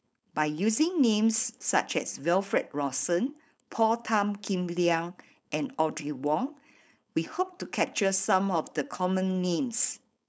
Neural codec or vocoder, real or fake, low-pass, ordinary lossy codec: codec, 16 kHz, 4.8 kbps, FACodec; fake; none; none